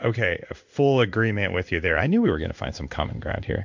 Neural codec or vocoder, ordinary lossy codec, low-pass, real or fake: codec, 16 kHz in and 24 kHz out, 1 kbps, XY-Tokenizer; MP3, 64 kbps; 7.2 kHz; fake